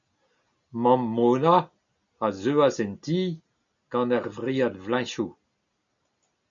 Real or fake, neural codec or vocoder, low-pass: real; none; 7.2 kHz